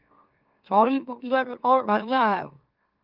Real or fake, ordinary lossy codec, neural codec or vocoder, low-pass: fake; Opus, 32 kbps; autoencoder, 44.1 kHz, a latent of 192 numbers a frame, MeloTTS; 5.4 kHz